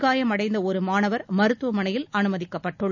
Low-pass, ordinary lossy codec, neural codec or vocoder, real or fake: 7.2 kHz; none; none; real